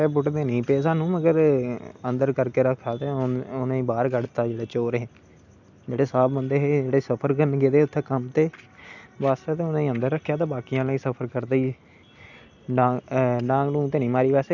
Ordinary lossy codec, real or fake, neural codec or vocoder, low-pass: none; real; none; 7.2 kHz